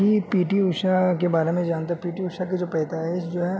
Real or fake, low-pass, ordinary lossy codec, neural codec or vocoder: real; none; none; none